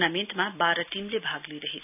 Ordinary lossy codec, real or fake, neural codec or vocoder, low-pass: none; real; none; 3.6 kHz